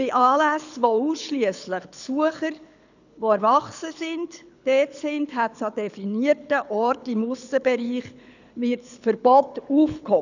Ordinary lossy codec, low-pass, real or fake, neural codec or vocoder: none; 7.2 kHz; fake; codec, 24 kHz, 6 kbps, HILCodec